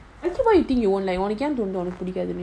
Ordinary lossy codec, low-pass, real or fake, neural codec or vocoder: none; none; real; none